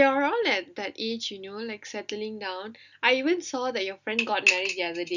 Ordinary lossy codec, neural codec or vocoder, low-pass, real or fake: none; none; 7.2 kHz; real